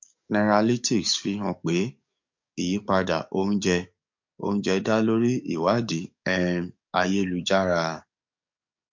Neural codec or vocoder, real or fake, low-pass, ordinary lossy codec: codec, 44.1 kHz, 7.8 kbps, DAC; fake; 7.2 kHz; MP3, 48 kbps